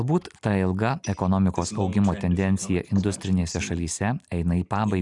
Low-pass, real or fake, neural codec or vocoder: 10.8 kHz; real; none